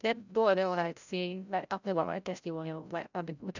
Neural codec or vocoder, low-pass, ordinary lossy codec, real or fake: codec, 16 kHz, 0.5 kbps, FreqCodec, larger model; 7.2 kHz; none; fake